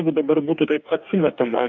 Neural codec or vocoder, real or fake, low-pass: codec, 44.1 kHz, 2.6 kbps, DAC; fake; 7.2 kHz